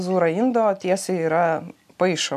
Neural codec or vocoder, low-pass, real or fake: none; 14.4 kHz; real